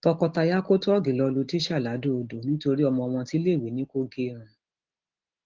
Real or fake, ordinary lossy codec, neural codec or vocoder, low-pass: real; Opus, 16 kbps; none; 7.2 kHz